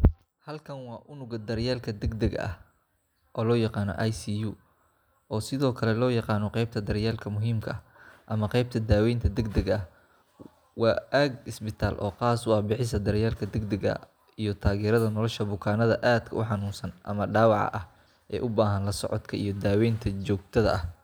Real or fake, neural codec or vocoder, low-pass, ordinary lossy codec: real; none; none; none